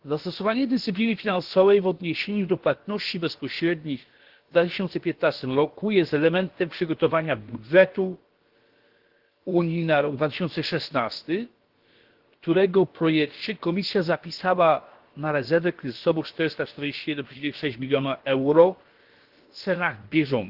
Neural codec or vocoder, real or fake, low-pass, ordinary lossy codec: codec, 16 kHz, about 1 kbps, DyCAST, with the encoder's durations; fake; 5.4 kHz; Opus, 16 kbps